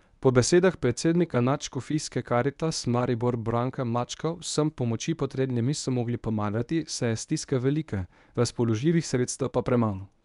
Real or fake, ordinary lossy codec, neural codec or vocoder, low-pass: fake; none; codec, 24 kHz, 0.9 kbps, WavTokenizer, medium speech release version 1; 10.8 kHz